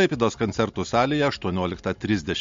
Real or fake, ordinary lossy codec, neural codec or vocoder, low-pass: real; MP3, 48 kbps; none; 7.2 kHz